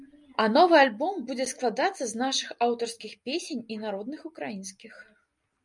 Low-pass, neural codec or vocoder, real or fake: 10.8 kHz; none; real